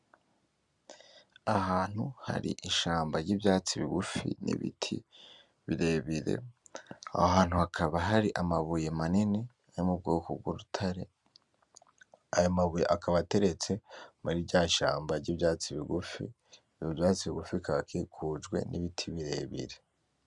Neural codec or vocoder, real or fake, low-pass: none; real; 10.8 kHz